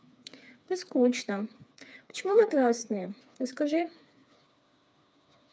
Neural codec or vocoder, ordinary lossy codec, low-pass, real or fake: codec, 16 kHz, 4 kbps, FreqCodec, smaller model; none; none; fake